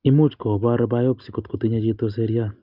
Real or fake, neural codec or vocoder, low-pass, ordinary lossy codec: real; none; 5.4 kHz; Opus, 24 kbps